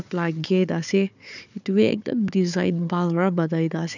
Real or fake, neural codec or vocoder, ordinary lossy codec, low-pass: fake; codec, 16 kHz, 4 kbps, FunCodec, trained on Chinese and English, 50 frames a second; none; 7.2 kHz